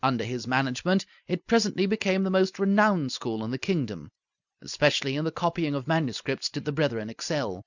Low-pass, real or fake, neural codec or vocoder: 7.2 kHz; real; none